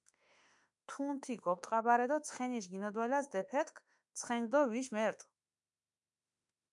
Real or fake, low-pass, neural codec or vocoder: fake; 10.8 kHz; autoencoder, 48 kHz, 32 numbers a frame, DAC-VAE, trained on Japanese speech